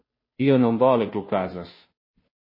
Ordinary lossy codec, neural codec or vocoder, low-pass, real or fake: MP3, 24 kbps; codec, 16 kHz, 0.5 kbps, FunCodec, trained on Chinese and English, 25 frames a second; 5.4 kHz; fake